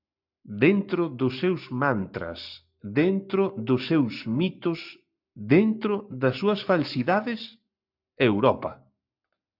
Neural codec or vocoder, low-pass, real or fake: codec, 44.1 kHz, 7.8 kbps, Pupu-Codec; 5.4 kHz; fake